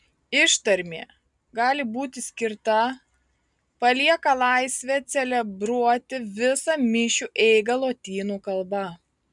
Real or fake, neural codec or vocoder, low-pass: real; none; 10.8 kHz